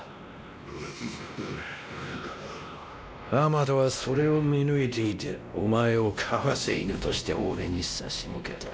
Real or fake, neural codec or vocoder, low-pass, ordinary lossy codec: fake; codec, 16 kHz, 1 kbps, X-Codec, WavLM features, trained on Multilingual LibriSpeech; none; none